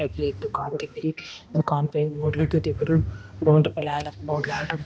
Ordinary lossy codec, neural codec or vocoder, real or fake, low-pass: none; codec, 16 kHz, 1 kbps, X-Codec, HuBERT features, trained on balanced general audio; fake; none